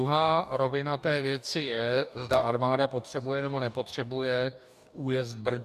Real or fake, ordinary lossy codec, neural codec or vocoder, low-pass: fake; MP3, 96 kbps; codec, 44.1 kHz, 2.6 kbps, DAC; 14.4 kHz